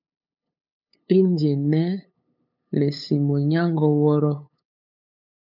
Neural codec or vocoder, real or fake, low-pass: codec, 16 kHz, 8 kbps, FunCodec, trained on LibriTTS, 25 frames a second; fake; 5.4 kHz